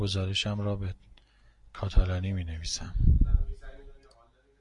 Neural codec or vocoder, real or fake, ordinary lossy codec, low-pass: none; real; MP3, 64 kbps; 10.8 kHz